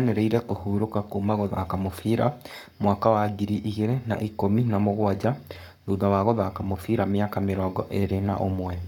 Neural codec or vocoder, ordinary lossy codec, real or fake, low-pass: codec, 44.1 kHz, 7.8 kbps, Pupu-Codec; none; fake; 19.8 kHz